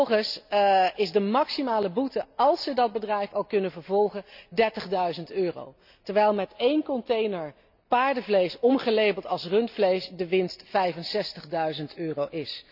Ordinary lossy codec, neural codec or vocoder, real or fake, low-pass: none; none; real; 5.4 kHz